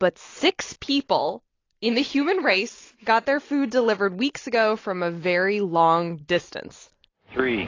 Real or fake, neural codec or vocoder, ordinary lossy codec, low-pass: real; none; AAC, 32 kbps; 7.2 kHz